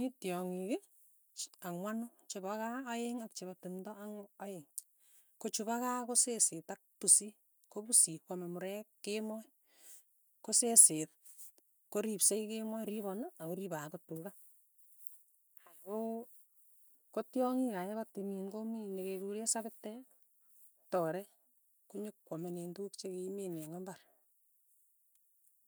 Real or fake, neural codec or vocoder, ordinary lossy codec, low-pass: real; none; none; none